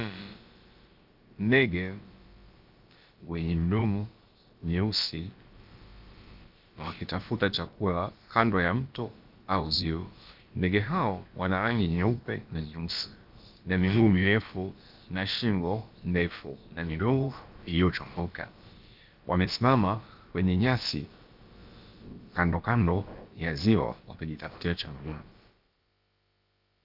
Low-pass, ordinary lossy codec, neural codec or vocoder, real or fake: 5.4 kHz; Opus, 24 kbps; codec, 16 kHz, about 1 kbps, DyCAST, with the encoder's durations; fake